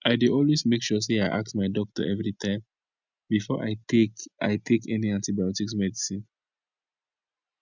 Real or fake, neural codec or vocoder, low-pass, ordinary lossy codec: real; none; 7.2 kHz; none